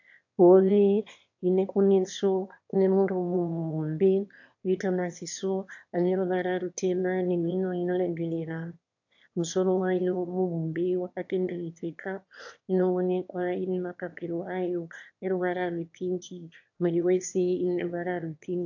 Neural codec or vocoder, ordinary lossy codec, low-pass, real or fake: autoencoder, 22.05 kHz, a latent of 192 numbers a frame, VITS, trained on one speaker; AAC, 48 kbps; 7.2 kHz; fake